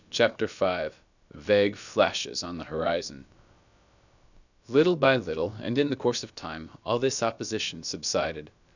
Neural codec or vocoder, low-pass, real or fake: codec, 16 kHz, about 1 kbps, DyCAST, with the encoder's durations; 7.2 kHz; fake